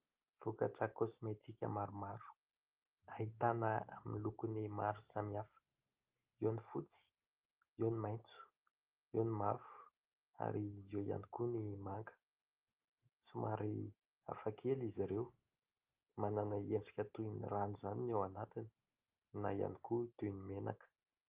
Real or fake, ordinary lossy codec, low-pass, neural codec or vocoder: real; Opus, 24 kbps; 3.6 kHz; none